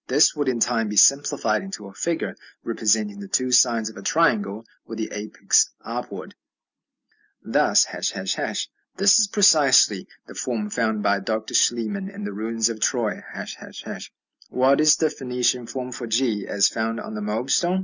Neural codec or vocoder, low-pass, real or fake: none; 7.2 kHz; real